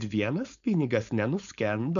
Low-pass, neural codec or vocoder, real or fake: 7.2 kHz; codec, 16 kHz, 4.8 kbps, FACodec; fake